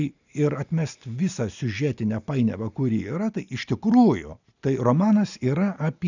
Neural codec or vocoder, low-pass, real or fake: none; 7.2 kHz; real